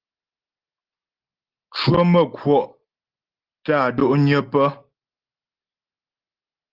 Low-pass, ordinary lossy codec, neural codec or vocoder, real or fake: 5.4 kHz; Opus, 16 kbps; none; real